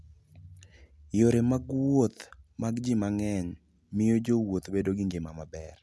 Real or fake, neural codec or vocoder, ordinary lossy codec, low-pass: real; none; none; none